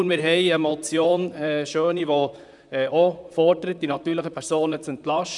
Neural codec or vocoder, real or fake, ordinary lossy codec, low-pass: vocoder, 44.1 kHz, 128 mel bands, Pupu-Vocoder; fake; none; 10.8 kHz